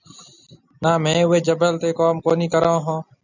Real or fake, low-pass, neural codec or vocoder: real; 7.2 kHz; none